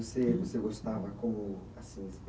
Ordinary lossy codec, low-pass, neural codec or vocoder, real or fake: none; none; none; real